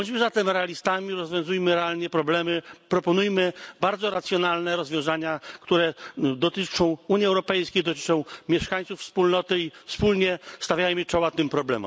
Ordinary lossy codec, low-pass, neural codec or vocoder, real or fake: none; none; none; real